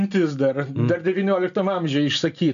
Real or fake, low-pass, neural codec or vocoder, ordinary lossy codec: real; 7.2 kHz; none; AAC, 96 kbps